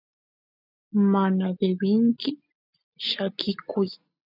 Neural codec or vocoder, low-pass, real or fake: none; 5.4 kHz; real